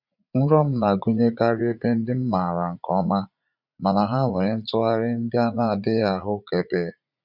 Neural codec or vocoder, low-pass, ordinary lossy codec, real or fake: vocoder, 44.1 kHz, 80 mel bands, Vocos; 5.4 kHz; none; fake